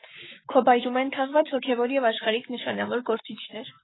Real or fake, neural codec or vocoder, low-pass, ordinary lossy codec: fake; codec, 44.1 kHz, 7.8 kbps, Pupu-Codec; 7.2 kHz; AAC, 16 kbps